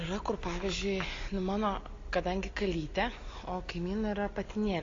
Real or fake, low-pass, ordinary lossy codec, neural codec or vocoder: real; 7.2 kHz; AAC, 32 kbps; none